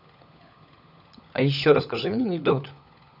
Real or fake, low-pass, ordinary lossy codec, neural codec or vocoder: fake; 5.4 kHz; none; codec, 16 kHz, 16 kbps, FunCodec, trained on LibriTTS, 50 frames a second